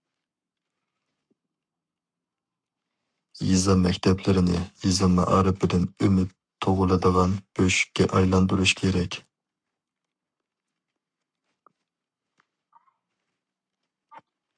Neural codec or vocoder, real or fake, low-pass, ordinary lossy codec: codec, 44.1 kHz, 7.8 kbps, Pupu-Codec; fake; 9.9 kHz; MP3, 96 kbps